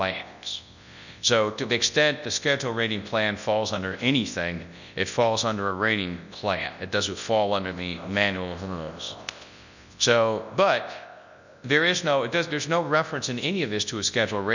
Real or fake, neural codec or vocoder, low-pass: fake; codec, 24 kHz, 0.9 kbps, WavTokenizer, large speech release; 7.2 kHz